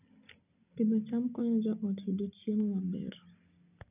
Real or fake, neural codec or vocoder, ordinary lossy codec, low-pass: real; none; none; 3.6 kHz